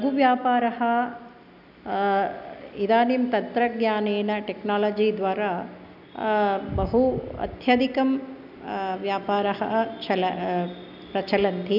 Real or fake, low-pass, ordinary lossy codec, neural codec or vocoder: real; 5.4 kHz; none; none